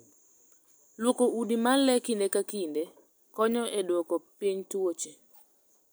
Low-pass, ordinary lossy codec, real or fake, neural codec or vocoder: none; none; real; none